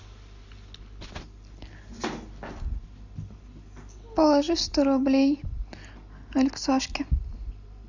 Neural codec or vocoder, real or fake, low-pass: none; real; 7.2 kHz